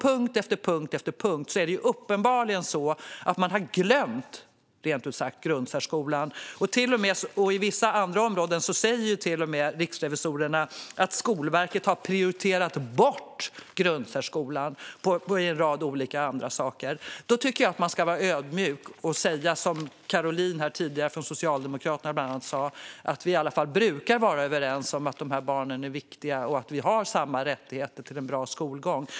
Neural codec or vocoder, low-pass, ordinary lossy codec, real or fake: none; none; none; real